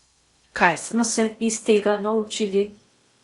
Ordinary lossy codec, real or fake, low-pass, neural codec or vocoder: Opus, 64 kbps; fake; 10.8 kHz; codec, 16 kHz in and 24 kHz out, 0.6 kbps, FocalCodec, streaming, 4096 codes